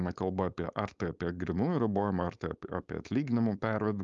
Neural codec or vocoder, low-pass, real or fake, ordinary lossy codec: codec, 16 kHz, 4.8 kbps, FACodec; 7.2 kHz; fake; Opus, 32 kbps